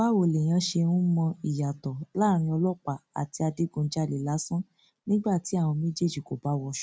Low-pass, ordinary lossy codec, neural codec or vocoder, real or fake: none; none; none; real